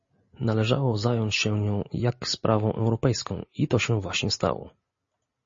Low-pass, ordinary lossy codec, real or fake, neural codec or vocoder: 7.2 kHz; MP3, 32 kbps; real; none